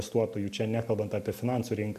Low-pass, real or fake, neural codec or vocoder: 14.4 kHz; real; none